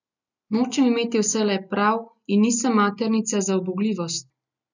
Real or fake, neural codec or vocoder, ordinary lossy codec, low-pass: real; none; none; 7.2 kHz